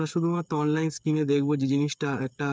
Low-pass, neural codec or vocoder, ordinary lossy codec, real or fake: none; codec, 16 kHz, 8 kbps, FreqCodec, smaller model; none; fake